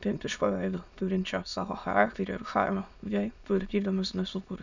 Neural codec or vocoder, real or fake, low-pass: autoencoder, 22.05 kHz, a latent of 192 numbers a frame, VITS, trained on many speakers; fake; 7.2 kHz